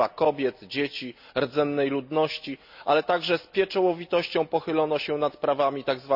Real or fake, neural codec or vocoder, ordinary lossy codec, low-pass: real; none; none; 5.4 kHz